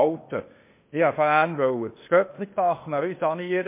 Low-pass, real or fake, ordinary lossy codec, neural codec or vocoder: 3.6 kHz; fake; MP3, 24 kbps; codec, 16 kHz, 0.8 kbps, ZipCodec